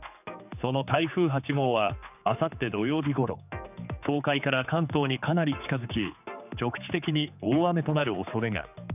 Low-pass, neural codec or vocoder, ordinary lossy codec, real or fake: 3.6 kHz; codec, 16 kHz, 4 kbps, X-Codec, HuBERT features, trained on general audio; none; fake